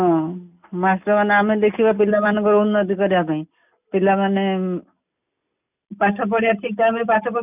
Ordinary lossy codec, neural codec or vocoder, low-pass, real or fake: none; none; 3.6 kHz; real